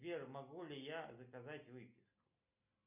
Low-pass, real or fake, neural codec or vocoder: 3.6 kHz; real; none